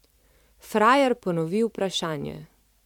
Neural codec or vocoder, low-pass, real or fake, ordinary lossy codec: vocoder, 44.1 kHz, 128 mel bands every 512 samples, BigVGAN v2; 19.8 kHz; fake; MP3, 96 kbps